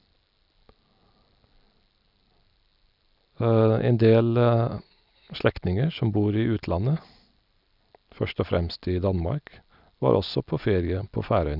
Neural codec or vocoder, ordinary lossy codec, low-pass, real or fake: none; none; 5.4 kHz; real